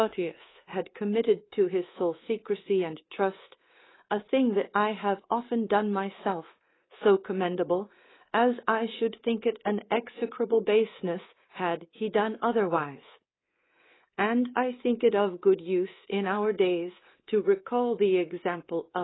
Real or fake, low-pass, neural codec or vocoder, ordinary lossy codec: fake; 7.2 kHz; codec, 24 kHz, 3.1 kbps, DualCodec; AAC, 16 kbps